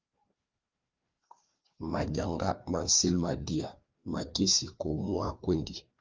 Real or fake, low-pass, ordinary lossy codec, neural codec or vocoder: fake; 7.2 kHz; Opus, 32 kbps; codec, 16 kHz, 2 kbps, FreqCodec, larger model